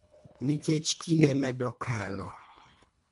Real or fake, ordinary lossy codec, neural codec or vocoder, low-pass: fake; MP3, 64 kbps; codec, 24 kHz, 1.5 kbps, HILCodec; 10.8 kHz